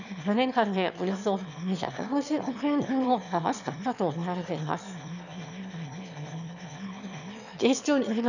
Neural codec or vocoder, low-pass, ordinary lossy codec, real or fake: autoencoder, 22.05 kHz, a latent of 192 numbers a frame, VITS, trained on one speaker; 7.2 kHz; none; fake